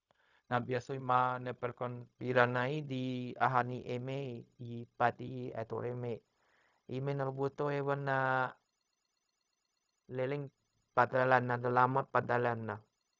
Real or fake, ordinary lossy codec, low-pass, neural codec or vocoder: fake; none; 7.2 kHz; codec, 16 kHz, 0.4 kbps, LongCat-Audio-Codec